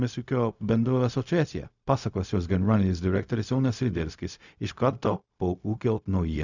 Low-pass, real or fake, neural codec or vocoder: 7.2 kHz; fake; codec, 16 kHz, 0.4 kbps, LongCat-Audio-Codec